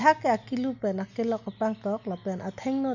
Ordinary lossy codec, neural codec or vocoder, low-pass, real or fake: none; none; 7.2 kHz; real